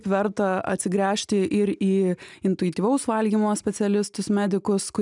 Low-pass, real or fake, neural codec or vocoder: 10.8 kHz; real; none